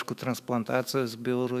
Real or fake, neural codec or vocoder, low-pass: fake; autoencoder, 48 kHz, 128 numbers a frame, DAC-VAE, trained on Japanese speech; 14.4 kHz